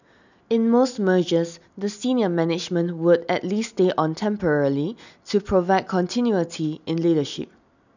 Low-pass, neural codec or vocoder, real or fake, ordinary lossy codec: 7.2 kHz; none; real; none